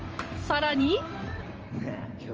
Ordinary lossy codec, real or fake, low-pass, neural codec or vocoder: Opus, 24 kbps; fake; 7.2 kHz; vocoder, 44.1 kHz, 128 mel bands every 512 samples, BigVGAN v2